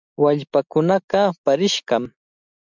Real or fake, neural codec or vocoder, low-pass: real; none; 7.2 kHz